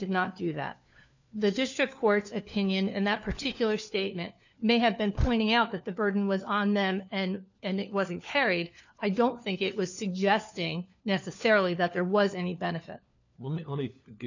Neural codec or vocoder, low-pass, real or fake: codec, 16 kHz, 4 kbps, FunCodec, trained on LibriTTS, 50 frames a second; 7.2 kHz; fake